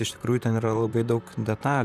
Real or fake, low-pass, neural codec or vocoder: fake; 14.4 kHz; vocoder, 44.1 kHz, 128 mel bands, Pupu-Vocoder